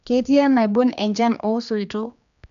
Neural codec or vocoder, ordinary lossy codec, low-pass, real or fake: codec, 16 kHz, 2 kbps, X-Codec, HuBERT features, trained on general audio; none; 7.2 kHz; fake